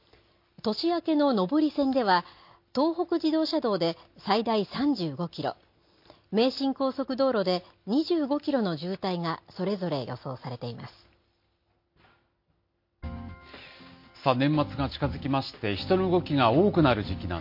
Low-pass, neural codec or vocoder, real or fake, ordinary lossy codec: 5.4 kHz; none; real; MP3, 32 kbps